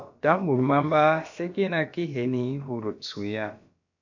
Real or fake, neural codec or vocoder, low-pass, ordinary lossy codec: fake; codec, 16 kHz, about 1 kbps, DyCAST, with the encoder's durations; 7.2 kHz; AAC, 48 kbps